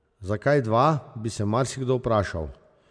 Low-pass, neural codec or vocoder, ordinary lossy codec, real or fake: 9.9 kHz; none; none; real